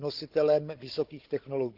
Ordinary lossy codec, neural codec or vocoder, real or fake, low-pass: Opus, 16 kbps; codec, 16 kHz, 16 kbps, FunCodec, trained on Chinese and English, 50 frames a second; fake; 5.4 kHz